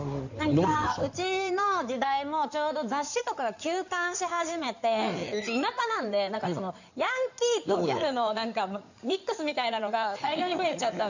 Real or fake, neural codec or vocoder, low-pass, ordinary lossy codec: fake; codec, 16 kHz in and 24 kHz out, 2.2 kbps, FireRedTTS-2 codec; 7.2 kHz; none